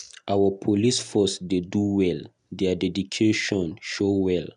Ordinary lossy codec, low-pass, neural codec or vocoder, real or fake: none; 10.8 kHz; none; real